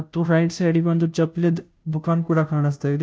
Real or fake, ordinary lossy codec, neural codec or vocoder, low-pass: fake; none; codec, 16 kHz, 0.5 kbps, FunCodec, trained on Chinese and English, 25 frames a second; none